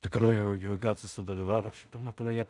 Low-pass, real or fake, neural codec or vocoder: 10.8 kHz; fake; codec, 16 kHz in and 24 kHz out, 0.4 kbps, LongCat-Audio-Codec, two codebook decoder